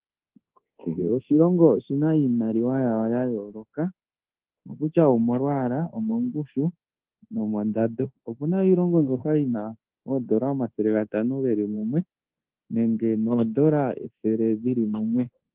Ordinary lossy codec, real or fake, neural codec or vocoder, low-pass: Opus, 16 kbps; fake; codec, 24 kHz, 1.2 kbps, DualCodec; 3.6 kHz